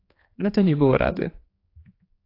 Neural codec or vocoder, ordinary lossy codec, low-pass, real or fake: codec, 16 kHz, 2 kbps, X-Codec, HuBERT features, trained on general audio; AAC, 24 kbps; 5.4 kHz; fake